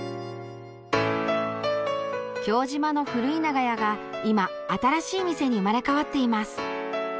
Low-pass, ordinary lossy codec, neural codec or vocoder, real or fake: none; none; none; real